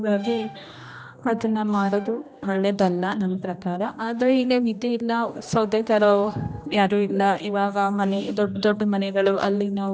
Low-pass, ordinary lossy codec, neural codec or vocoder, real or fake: none; none; codec, 16 kHz, 1 kbps, X-Codec, HuBERT features, trained on general audio; fake